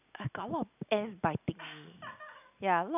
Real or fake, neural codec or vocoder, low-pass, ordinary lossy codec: real; none; 3.6 kHz; none